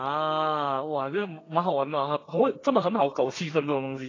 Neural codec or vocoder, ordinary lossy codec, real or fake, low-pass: codec, 32 kHz, 1.9 kbps, SNAC; AAC, 32 kbps; fake; 7.2 kHz